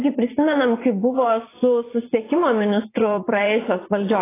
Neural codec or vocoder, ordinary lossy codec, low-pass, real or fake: vocoder, 44.1 kHz, 80 mel bands, Vocos; AAC, 16 kbps; 3.6 kHz; fake